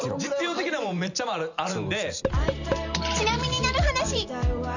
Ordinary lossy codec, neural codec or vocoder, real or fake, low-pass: none; vocoder, 44.1 kHz, 128 mel bands every 512 samples, BigVGAN v2; fake; 7.2 kHz